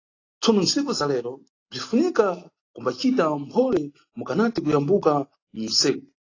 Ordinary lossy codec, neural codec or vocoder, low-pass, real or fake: AAC, 32 kbps; none; 7.2 kHz; real